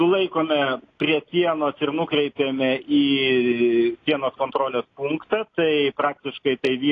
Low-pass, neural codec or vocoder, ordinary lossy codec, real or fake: 7.2 kHz; none; AAC, 32 kbps; real